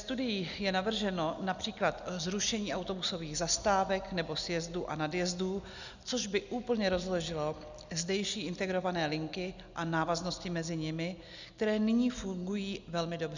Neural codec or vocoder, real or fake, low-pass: none; real; 7.2 kHz